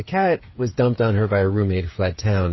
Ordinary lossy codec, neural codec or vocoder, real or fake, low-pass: MP3, 24 kbps; codec, 16 kHz, 8 kbps, FreqCodec, smaller model; fake; 7.2 kHz